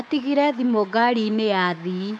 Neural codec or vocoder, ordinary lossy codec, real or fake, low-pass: codec, 24 kHz, 3.1 kbps, DualCodec; none; fake; none